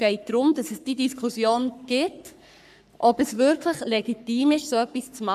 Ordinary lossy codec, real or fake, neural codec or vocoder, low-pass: none; fake; codec, 44.1 kHz, 3.4 kbps, Pupu-Codec; 14.4 kHz